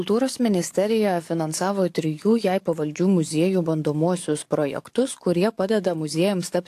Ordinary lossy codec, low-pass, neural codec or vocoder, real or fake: AAC, 64 kbps; 14.4 kHz; codec, 44.1 kHz, 7.8 kbps, DAC; fake